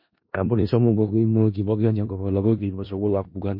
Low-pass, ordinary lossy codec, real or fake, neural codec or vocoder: 5.4 kHz; MP3, 32 kbps; fake; codec, 16 kHz in and 24 kHz out, 0.4 kbps, LongCat-Audio-Codec, four codebook decoder